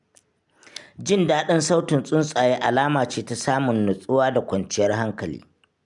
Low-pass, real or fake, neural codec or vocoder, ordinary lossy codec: 10.8 kHz; real; none; none